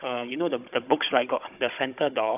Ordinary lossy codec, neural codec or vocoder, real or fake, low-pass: none; codec, 16 kHz, 16 kbps, FunCodec, trained on Chinese and English, 50 frames a second; fake; 3.6 kHz